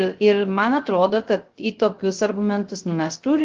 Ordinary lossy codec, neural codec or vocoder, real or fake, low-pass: Opus, 16 kbps; codec, 16 kHz, 0.3 kbps, FocalCodec; fake; 7.2 kHz